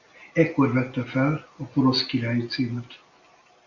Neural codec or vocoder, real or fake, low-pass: none; real; 7.2 kHz